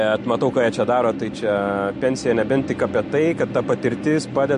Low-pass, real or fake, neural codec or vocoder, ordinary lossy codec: 10.8 kHz; real; none; MP3, 48 kbps